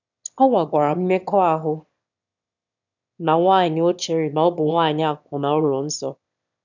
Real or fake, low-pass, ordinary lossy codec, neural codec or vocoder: fake; 7.2 kHz; none; autoencoder, 22.05 kHz, a latent of 192 numbers a frame, VITS, trained on one speaker